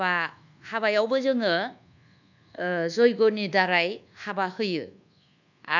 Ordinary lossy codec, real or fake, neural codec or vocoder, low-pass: none; fake; codec, 24 kHz, 1.2 kbps, DualCodec; 7.2 kHz